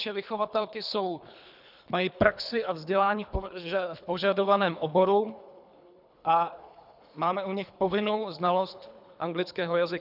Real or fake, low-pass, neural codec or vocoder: fake; 5.4 kHz; codec, 24 kHz, 3 kbps, HILCodec